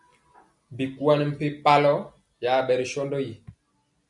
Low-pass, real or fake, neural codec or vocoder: 10.8 kHz; real; none